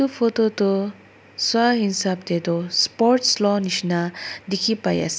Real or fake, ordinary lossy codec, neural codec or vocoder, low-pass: real; none; none; none